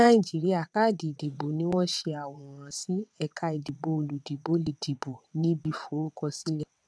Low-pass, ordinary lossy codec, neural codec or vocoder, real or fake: none; none; vocoder, 22.05 kHz, 80 mel bands, WaveNeXt; fake